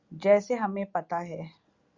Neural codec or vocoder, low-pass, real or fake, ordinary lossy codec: none; 7.2 kHz; real; Opus, 64 kbps